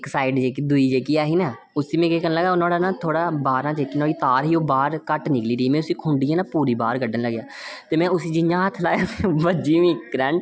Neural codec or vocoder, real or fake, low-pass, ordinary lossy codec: none; real; none; none